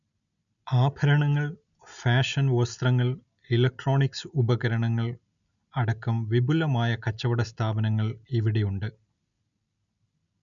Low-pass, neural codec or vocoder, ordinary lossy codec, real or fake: 7.2 kHz; none; none; real